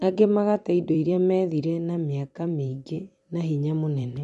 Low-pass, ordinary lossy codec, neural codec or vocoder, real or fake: 14.4 kHz; MP3, 48 kbps; vocoder, 44.1 kHz, 128 mel bands every 256 samples, BigVGAN v2; fake